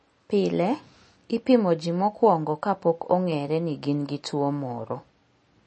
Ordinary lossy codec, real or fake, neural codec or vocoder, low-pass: MP3, 32 kbps; real; none; 9.9 kHz